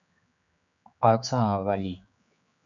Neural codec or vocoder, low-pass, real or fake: codec, 16 kHz, 4 kbps, X-Codec, HuBERT features, trained on general audio; 7.2 kHz; fake